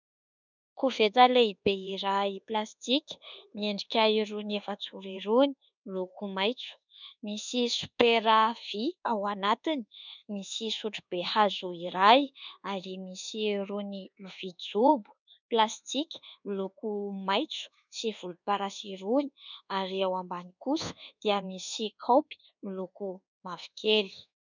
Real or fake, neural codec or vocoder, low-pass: fake; codec, 24 kHz, 1.2 kbps, DualCodec; 7.2 kHz